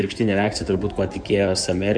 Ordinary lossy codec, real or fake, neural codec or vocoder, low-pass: MP3, 64 kbps; fake; codec, 16 kHz in and 24 kHz out, 2.2 kbps, FireRedTTS-2 codec; 9.9 kHz